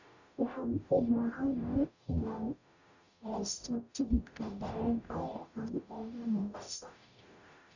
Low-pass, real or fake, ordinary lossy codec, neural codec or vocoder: 7.2 kHz; fake; none; codec, 44.1 kHz, 0.9 kbps, DAC